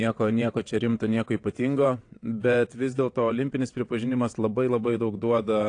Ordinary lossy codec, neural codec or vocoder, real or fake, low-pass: AAC, 48 kbps; vocoder, 22.05 kHz, 80 mel bands, WaveNeXt; fake; 9.9 kHz